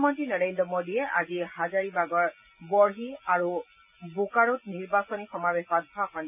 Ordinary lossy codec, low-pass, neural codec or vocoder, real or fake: none; 3.6 kHz; none; real